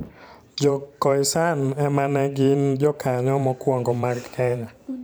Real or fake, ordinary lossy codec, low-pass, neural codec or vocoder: fake; none; none; vocoder, 44.1 kHz, 128 mel bands every 512 samples, BigVGAN v2